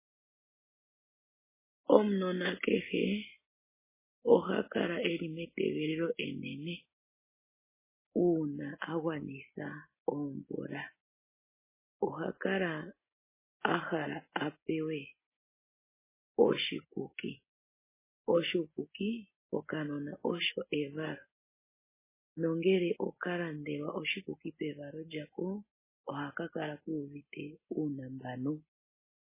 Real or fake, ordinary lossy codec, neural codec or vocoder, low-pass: real; MP3, 16 kbps; none; 3.6 kHz